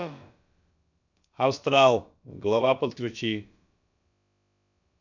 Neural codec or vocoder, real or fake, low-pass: codec, 16 kHz, about 1 kbps, DyCAST, with the encoder's durations; fake; 7.2 kHz